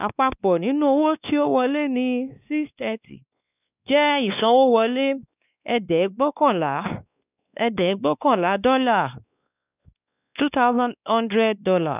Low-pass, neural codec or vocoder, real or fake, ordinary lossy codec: 3.6 kHz; codec, 16 kHz, 2 kbps, X-Codec, WavLM features, trained on Multilingual LibriSpeech; fake; none